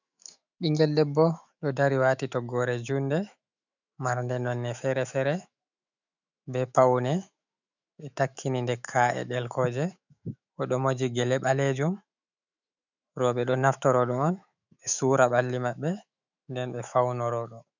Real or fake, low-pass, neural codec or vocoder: fake; 7.2 kHz; autoencoder, 48 kHz, 128 numbers a frame, DAC-VAE, trained on Japanese speech